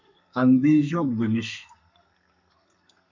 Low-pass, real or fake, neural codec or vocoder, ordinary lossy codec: 7.2 kHz; fake; codec, 32 kHz, 1.9 kbps, SNAC; MP3, 48 kbps